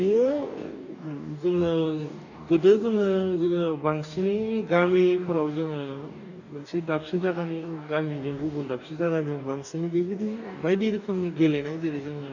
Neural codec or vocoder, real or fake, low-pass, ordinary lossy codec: codec, 44.1 kHz, 2.6 kbps, DAC; fake; 7.2 kHz; none